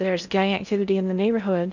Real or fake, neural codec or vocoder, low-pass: fake; codec, 16 kHz in and 24 kHz out, 0.6 kbps, FocalCodec, streaming, 4096 codes; 7.2 kHz